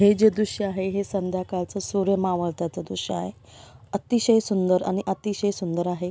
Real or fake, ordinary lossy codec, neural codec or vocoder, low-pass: real; none; none; none